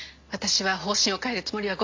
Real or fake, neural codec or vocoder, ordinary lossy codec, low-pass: real; none; MP3, 48 kbps; 7.2 kHz